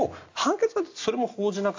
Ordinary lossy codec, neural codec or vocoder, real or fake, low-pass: AAC, 48 kbps; none; real; 7.2 kHz